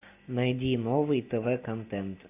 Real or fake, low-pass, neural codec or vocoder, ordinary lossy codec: real; 3.6 kHz; none; AAC, 24 kbps